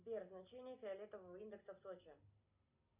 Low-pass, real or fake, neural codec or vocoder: 3.6 kHz; real; none